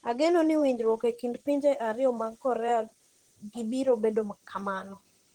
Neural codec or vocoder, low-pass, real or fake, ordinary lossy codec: vocoder, 44.1 kHz, 128 mel bands, Pupu-Vocoder; 19.8 kHz; fake; Opus, 16 kbps